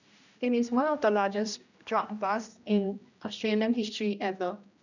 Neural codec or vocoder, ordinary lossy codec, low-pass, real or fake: codec, 16 kHz, 1 kbps, X-Codec, HuBERT features, trained on general audio; none; 7.2 kHz; fake